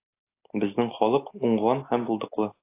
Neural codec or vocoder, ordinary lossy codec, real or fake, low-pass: none; AAC, 24 kbps; real; 3.6 kHz